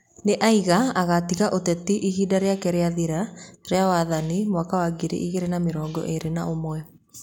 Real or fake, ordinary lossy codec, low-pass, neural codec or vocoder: real; none; 19.8 kHz; none